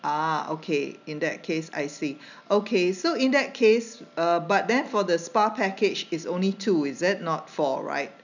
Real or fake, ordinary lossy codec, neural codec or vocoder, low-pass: real; none; none; 7.2 kHz